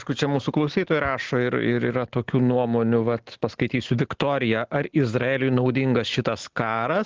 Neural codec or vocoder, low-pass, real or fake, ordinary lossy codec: none; 7.2 kHz; real; Opus, 16 kbps